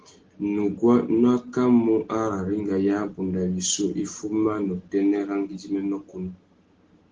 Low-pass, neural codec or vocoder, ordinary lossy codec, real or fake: 7.2 kHz; none; Opus, 16 kbps; real